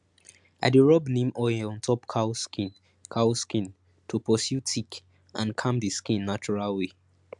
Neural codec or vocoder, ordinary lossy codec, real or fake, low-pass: none; MP3, 96 kbps; real; 10.8 kHz